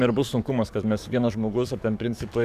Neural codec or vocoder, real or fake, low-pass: codec, 44.1 kHz, 7.8 kbps, DAC; fake; 14.4 kHz